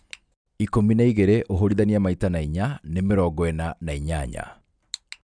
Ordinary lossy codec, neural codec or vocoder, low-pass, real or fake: none; none; 9.9 kHz; real